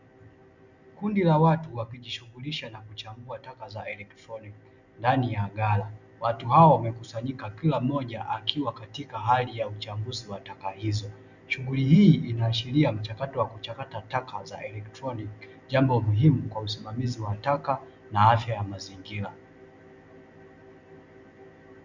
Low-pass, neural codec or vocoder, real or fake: 7.2 kHz; none; real